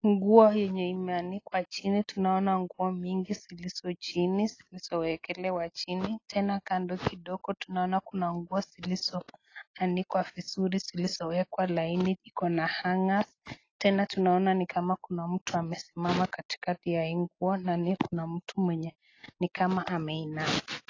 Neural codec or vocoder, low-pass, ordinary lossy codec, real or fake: none; 7.2 kHz; AAC, 32 kbps; real